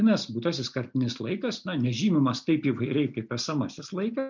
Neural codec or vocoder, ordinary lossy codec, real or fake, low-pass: none; MP3, 64 kbps; real; 7.2 kHz